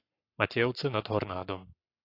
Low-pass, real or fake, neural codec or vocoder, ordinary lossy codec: 5.4 kHz; fake; codec, 44.1 kHz, 7.8 kbps, Pupu-Codec; AAC, 32 kbps